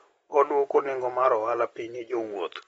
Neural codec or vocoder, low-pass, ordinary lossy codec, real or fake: none; 19.8 kHz; AAC, 24 kbps; real